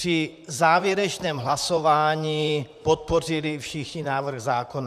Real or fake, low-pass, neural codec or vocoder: fake; 14.4 kHz; vocoder, 44.1 kHz, 128 mel bands, Pupu-Vocoder